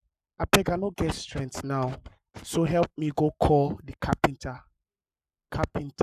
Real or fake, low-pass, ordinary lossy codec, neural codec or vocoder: fake; 14.4 kHz; none; codec, 44.1 kHz, 7.8 kbps, Pupu-Codec